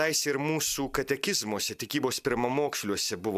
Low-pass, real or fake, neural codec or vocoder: 14.4 kHz; real; none